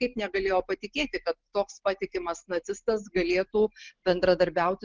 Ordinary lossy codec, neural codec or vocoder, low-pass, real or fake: Opus, 16 kbps; none; 7.2 kHz; real